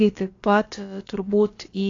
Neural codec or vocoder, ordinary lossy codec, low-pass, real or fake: codec, 16 kHz, about 1 kbps, DyCAST, with the encoder's durations; AAC, 32 kbps; 7.2 kHz; fake